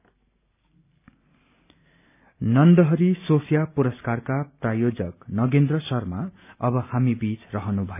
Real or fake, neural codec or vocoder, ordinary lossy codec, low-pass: real; none; none; 3.6 kHz